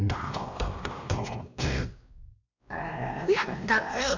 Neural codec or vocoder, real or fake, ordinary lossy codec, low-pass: codec, 16 kHz, 1 kbps, X-Codec, WavLM features, trained on Multilingual LibriSpeech; fake; none; 7.2 kHz